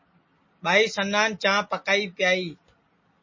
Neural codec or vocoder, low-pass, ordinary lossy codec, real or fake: none; 7.2 kHz; MP3, 32 kbps; real